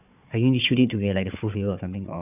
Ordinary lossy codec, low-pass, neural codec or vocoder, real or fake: none; 3.6 kHz; codec, 16 kHz, 4 kbps, FunCodec, trained on Chinese and English, 50 frames a second; fake